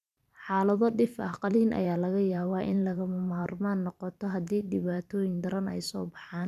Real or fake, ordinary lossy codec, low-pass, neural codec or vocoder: fake; none; 14.4 kHz; vocoder, 44.1 kHz, 128 mel bands every 512 samples, BigVGAN v2